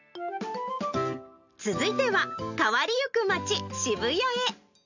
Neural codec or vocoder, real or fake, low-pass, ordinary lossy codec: none; real; 7.2 kHz; none